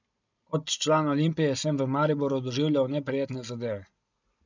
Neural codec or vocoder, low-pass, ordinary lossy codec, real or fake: none; 7.2 kHz; none; real